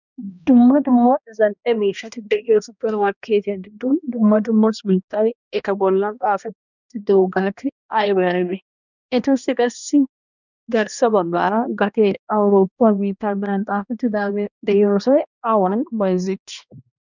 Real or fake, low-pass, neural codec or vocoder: fake; 7.2 kHz; codec, 16 kHz, 1 kbps, X-Codec, HuBERT features, trained on balanced general audio